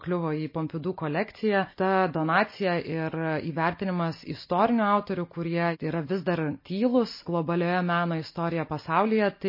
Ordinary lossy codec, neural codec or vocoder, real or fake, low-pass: MP3, 24 kbps; none; real; 5.4 kHz